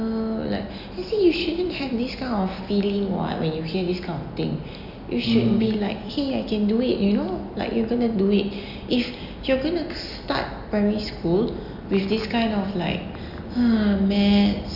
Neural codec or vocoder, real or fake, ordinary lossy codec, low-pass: none; real; none; 5.4 kHz